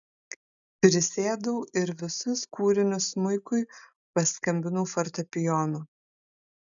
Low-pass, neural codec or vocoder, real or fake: 7.2 kHz; none; real